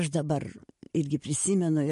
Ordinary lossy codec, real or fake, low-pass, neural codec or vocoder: MP3, 48 kbps; real; 14.4 kHz; none